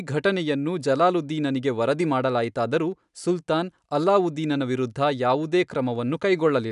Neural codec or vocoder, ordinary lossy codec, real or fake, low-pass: none; none; real; 10.8 kHz